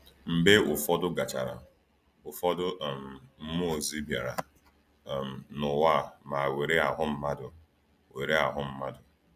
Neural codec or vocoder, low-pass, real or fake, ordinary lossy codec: none; 14.4 kHz; real; none